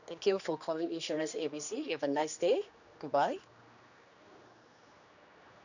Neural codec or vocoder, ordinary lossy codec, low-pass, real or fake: codec, 16 kHz, 2 kbps, X-Codec, HuBERT features, trained on general audio; none; 7.2 kHz; fake